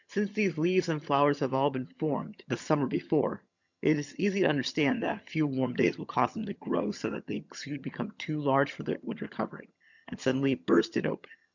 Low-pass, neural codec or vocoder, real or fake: 7.2 kHz; vocoder, 22.05 kHz, 80 mel bands, HiFi-GAN; fake